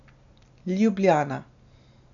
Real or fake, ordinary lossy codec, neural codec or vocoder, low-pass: real; none; none; 7.2 kHz